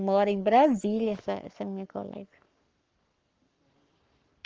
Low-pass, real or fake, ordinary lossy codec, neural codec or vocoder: 7.2 kHz; fake; Opus, 24 kbps; codec, 44.1 kHz, 7.8 kbps, Pupu-Codec